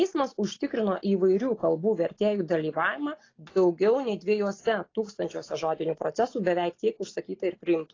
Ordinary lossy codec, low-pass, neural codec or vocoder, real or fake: AAC, 32 kbps; 7.2 kHz; none; real